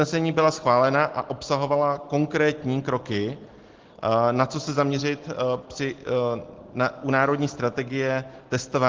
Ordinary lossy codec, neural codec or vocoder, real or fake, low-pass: Opus, 16 kbps; none; real; 7.2 kHz